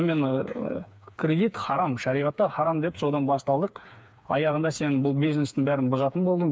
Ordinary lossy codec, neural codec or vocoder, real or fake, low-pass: none; codec, 16 kHz, 4 kbps, FreqCodec, smaller model; fake; none